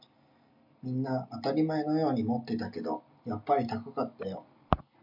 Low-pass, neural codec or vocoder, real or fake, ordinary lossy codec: 5.4 kHz; none; real; MP3, 48 kbps